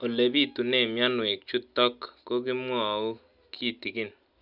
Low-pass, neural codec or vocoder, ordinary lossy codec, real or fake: 5.4 kHz; none; none; real